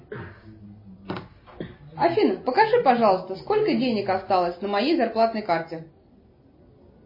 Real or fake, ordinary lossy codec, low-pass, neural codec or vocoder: real; MP3, 24 kbps; 5.4 kHz; none